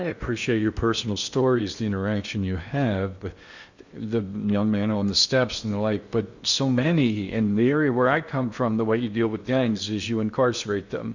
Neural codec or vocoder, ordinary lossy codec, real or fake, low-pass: codec, 16 kHz in and 24 kHz out, 0.8 kbps, FocalCodec, streaming, 65536 codes; Opus, 64 kbps; fake; 7.2 kHz